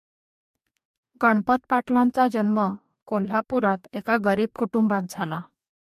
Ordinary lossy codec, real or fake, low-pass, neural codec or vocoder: MP3, 64 kbps; fake; 14.4 kHz; codec, 44.1 kHz, 2.6 kbps, DAC